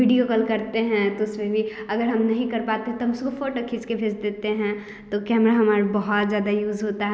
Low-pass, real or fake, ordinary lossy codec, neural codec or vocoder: none; real; none; none